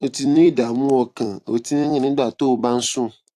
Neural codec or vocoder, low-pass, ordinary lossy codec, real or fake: vocoder, 44.1 kHz, 128 mel bands every 256 samples, BigVGAN v2; 14.4 kHz; AAC, 64 kbps; fake